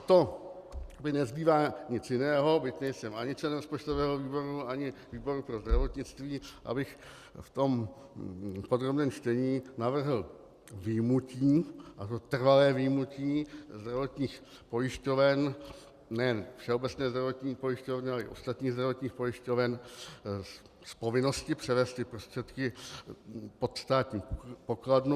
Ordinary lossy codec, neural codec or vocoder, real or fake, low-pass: Opus, 64 kbps; none; real; 14.4 kHz